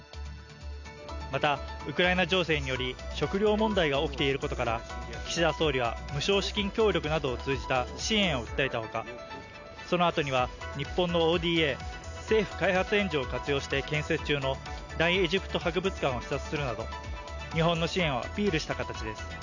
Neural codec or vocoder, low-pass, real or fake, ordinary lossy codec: none; 7.2 kHz; real; none